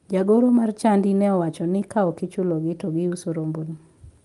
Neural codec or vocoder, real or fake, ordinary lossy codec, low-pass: none; real; Opus, 32 kbps; 10.8 kHz